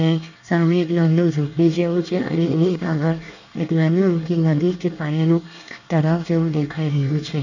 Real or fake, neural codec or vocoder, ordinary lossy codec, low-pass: fake; codec, 24 kHz, 1 kbps, SNAC; none; 7.2 kHz